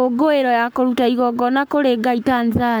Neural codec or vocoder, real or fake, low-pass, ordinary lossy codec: codec, 44.1 kHz, 7.8 kbps, Pupu-Codec; fake; none; none